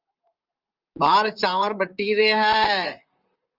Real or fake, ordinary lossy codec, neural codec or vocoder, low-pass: fake; Opus, 24 kbps; vocoder, 44.1 kHz, 128 mel bands, Pupu-Vocoder; 5.4 kHz